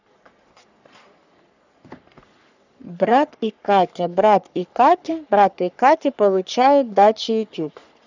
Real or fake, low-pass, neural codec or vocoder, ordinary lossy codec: fake; 7.2 kHz; codec, 44.1 kHz, 3.4 kbps, Pupu-Codec; none